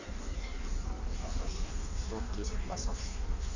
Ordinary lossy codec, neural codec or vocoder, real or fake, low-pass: none; codec, 16 kHz in and 24 kHz out, 1.1 kbps, FireRedTTS-2 codec; fake; 7.2 kHz